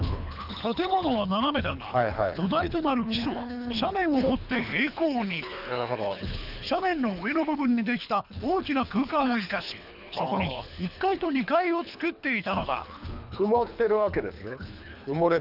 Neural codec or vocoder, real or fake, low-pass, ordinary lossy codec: codec, 24 kHz, 3 kbps, HILCodec; fake; 5.4 kHz; none